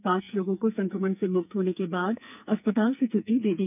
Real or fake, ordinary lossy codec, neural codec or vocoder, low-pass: fake; none; codec, 44.1 kHz, 2.6 kbps, SNAC; 3.6 kHz